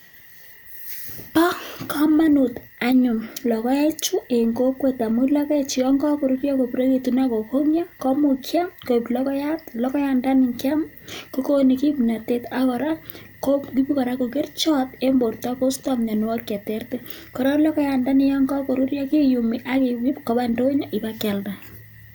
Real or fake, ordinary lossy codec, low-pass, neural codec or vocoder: real; none; none; none